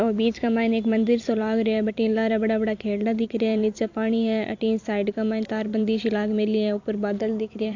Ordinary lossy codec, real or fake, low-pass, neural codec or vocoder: AAC, 48 kbps; fake; 7.2 kHz; vocoder, 44.1 kHz, 128 mel bands every 256 samples, BigVGAN v2